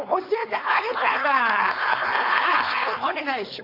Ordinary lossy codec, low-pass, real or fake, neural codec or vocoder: AAC, 32 kbps; 5.4 kHz; fake; codec, 16 kHz, 4.8 kbps, FACodec